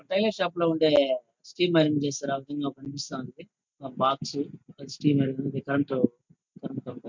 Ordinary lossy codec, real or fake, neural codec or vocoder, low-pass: MP3, 64 kbps; real; none; 7.2 kHz